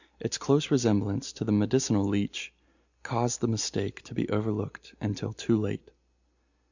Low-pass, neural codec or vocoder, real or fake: 7.2 kHz; none; real